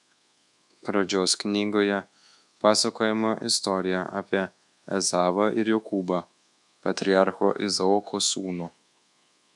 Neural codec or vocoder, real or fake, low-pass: codec, 24 kHz, 1.2 kbps, DualCodec; fake; 10.8 kHz